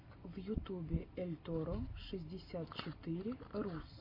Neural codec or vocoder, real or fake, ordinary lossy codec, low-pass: none; real; MP3, 32 kbps; 5.4 kHz